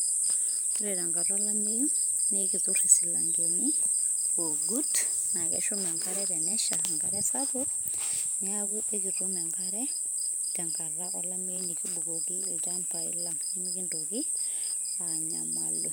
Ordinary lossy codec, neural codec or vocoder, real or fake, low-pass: none; vocoder, 44.1 kHz, 128 mel bands every 512 samples, BigVGAN v2; fake; none